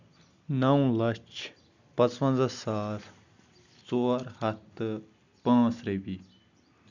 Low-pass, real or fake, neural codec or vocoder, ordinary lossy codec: 7.2 kHz; real; none; none